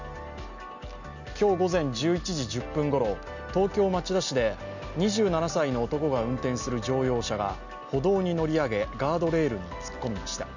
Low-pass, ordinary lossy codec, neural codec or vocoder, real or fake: 7.2 kHz; none; none; real